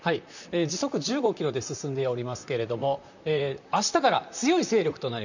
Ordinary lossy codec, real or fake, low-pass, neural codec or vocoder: none; fake; 7.2 kHz; vocoder, 44.1 kHz, 128 mel bands, Pupu-Vocoder